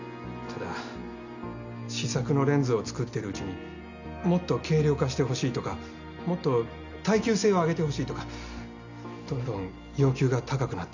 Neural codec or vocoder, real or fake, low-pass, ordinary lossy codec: none; real; 7.2 kHz; none